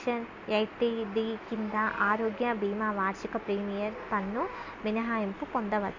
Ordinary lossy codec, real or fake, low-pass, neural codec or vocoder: MP3, 48 kbps; real; 7.2 kHz; none